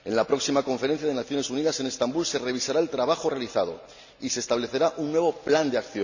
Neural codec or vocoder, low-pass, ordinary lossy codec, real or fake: none; 7.2 kHz; none; real